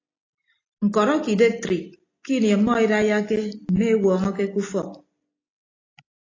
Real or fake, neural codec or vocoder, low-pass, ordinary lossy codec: real; none; 7.2 kHz; AAC, 32 kbps